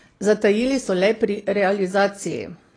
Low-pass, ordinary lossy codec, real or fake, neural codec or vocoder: 9.9 kHz; AAC, 32 kbps; real; none